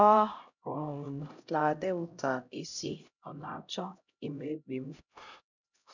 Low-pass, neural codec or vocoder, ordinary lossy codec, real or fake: 7.2 kHz; codec, 16 kHz, 0.5 kbps, X-Codec, HuBERT features, trained on LibriSpeech; none; fake